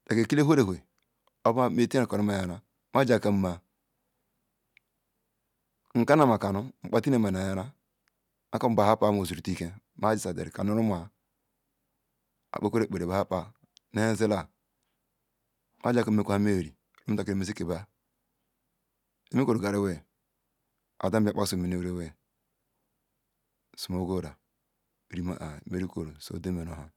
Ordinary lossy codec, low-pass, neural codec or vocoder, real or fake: none; 19.8 kHz; none; real